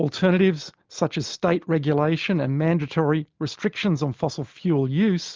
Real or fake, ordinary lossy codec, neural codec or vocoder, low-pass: real; Opus, 32 kbps; none; 7.2 kHz